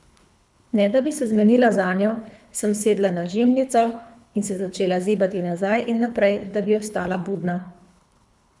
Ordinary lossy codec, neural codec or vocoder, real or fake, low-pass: none; codec, 24 kHz, 3 kbps, HILCodec; fake; none